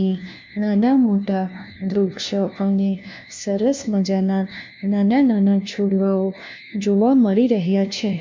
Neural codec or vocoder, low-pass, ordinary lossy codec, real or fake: codec, 16 kHz, 1 kbps, FunCodec, trained on LibriTTS, 50 frames a second; 7.2 kHz; MP3, 64 kbps; fake